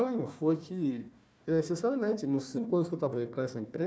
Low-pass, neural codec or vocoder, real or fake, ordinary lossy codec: none; codec, 16 kHz, 1 kbps, FunCodec, trained on Chinese and English, 50 frames a second; fake; none